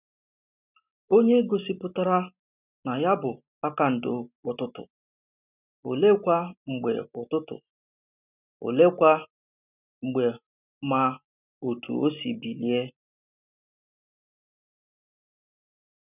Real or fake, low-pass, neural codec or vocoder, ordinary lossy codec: real; 3.6 kHz; none; none